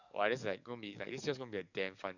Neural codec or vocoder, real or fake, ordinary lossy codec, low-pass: codec, 44.1 kHz, 7.8 kbps, DAC; fake; none; 7.2 kHz